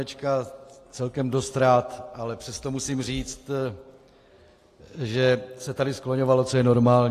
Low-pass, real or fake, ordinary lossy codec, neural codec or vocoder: 14.4 kHz; real; AAC, 48 kbps; none